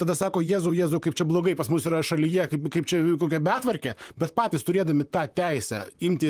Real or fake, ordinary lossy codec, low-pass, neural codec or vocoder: fake; Opus, 32 kbps; 14.4 kHz; vocoder, 44.1 kHz, 128 mel bands, Pupu-Vocoder